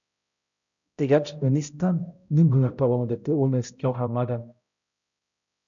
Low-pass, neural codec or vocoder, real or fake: 7.2 kHz; codec, 16 kHz, 0.5 kbps, X-Codec, HuBERT features, trained on balanced general audio; fake